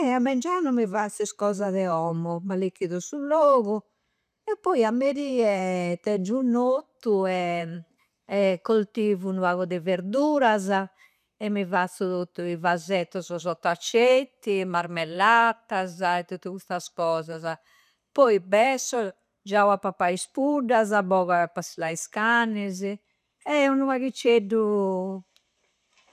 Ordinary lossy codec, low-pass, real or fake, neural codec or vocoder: none; 14.4 kHz; real; none